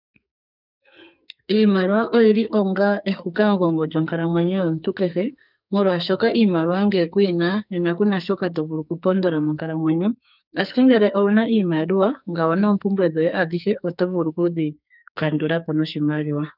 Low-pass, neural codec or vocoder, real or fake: 5.4 kHz; codec, 44.1 kHz, 2.6 kbps, SNAC; fake